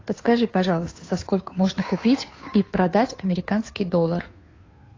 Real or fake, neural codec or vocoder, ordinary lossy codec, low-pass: fake; codec, 16 kHz, 2 kbps, FunCodec, trained on Chinese and English, 25 frames a second; AAC, 32 kbps; 7.2 kHz